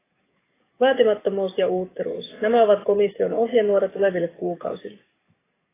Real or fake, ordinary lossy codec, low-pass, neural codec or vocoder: fake; AAC, 16 kbps; 3.6 kHz; codec, 44.1 kHz, 7.8 kbps, DAC